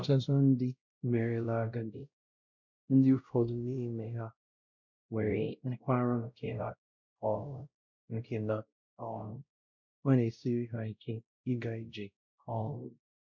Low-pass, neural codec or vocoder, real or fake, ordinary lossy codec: 7.2 kHz; codec, 16 kHz, 0.5 kbps, X-Codec, WavLM features, trained on Multilingual LibriSpeech; fake; MP3, 64 kbps